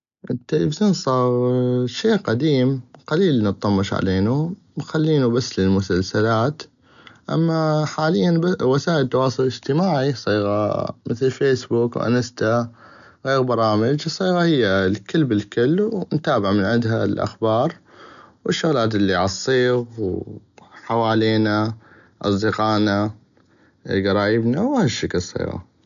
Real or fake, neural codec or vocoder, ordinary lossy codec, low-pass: real; none; none; 7.2 kHz